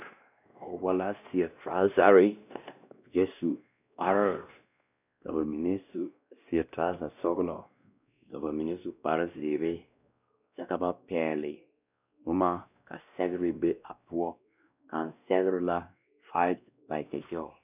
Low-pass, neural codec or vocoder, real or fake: 3.6 kHz; codec, 16 kHz, 1 kbps, X-Codec, WavLM features, trained on Multilingual LibriSpeech; fake